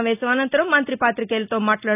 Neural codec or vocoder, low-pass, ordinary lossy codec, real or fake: none; 3.6 kHz; none; real